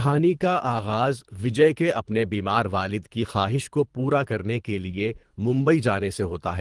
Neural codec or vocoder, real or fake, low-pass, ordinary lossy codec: codec, 24 kHz, 3 kbps, HILCodec; fake; 10.8 kHz; Opus, 24 kbps